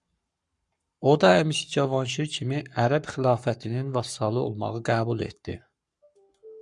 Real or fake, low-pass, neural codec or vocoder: fake; 10.8 kHz; codec, 44.1 kHz, 7.8 kbps, Pupu-Codec